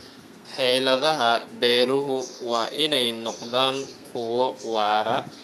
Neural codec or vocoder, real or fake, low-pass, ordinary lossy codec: codec, 32 kHz, 1.9 kbps, SNAC; fake; 14.4 kHz; none